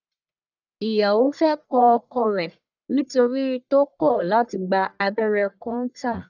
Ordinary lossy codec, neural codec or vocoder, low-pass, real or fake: none; codec, 44.1 kHz, 1.7 kbps, Pupu-Codec; 7.2 kHz; fake